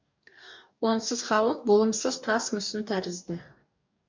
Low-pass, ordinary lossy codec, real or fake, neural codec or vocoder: 7.2 kHz; MP3, 64 kbps; fake; codec, 44.1 kHz, 2.6 kbps, DAC